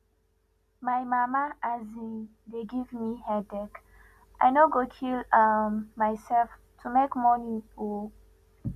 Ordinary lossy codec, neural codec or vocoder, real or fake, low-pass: none; none; real; 14.4 kHz